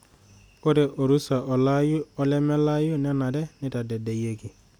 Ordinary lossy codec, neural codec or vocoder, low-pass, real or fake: none; none; 19.8 kHz; real